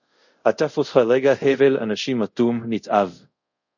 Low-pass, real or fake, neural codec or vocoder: 7.2 kHz; fake; codec, 24 kHz, 0.5 kbps, DualCodec